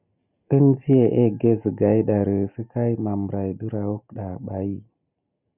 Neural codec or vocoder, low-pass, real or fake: none; 3.6 kHz; real